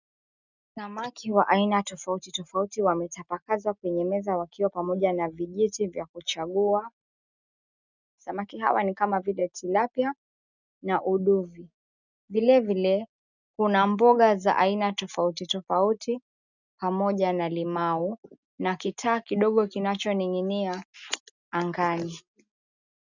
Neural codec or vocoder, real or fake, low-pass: none; real; 7.2 kHz